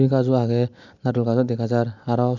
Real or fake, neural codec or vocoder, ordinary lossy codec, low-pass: real; none; none; 7.2 kHz